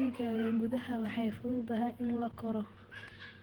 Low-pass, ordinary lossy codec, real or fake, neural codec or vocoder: 19.8 kHz; Opus, 16 kbps; fake; vocoder, 48 kHz, 128 mel bands, Vocos